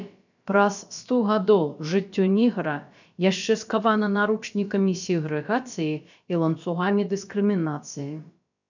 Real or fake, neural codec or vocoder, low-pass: fake; codec, 16 kHz, about 1 kbps, DyCAST, with the encoder's durations; 7.2 kHz